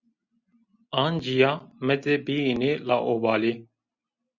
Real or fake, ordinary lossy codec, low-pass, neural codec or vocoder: real; Opus, 64 kbps; 7.2 kHz; none